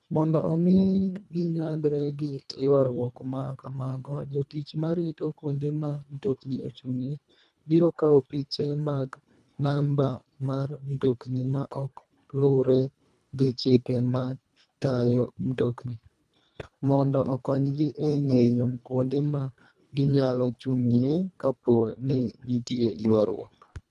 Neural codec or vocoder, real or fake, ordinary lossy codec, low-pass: codec, 24 kHz, 1.5 kbps, HILCodec; fake; none; none